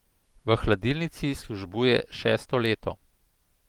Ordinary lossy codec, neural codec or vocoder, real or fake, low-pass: Opus, 24 kbps; vocoder, 44.1 kHz, 128 mel bands every 512 samples, BigVGAN v2; fake; 19.8 kHz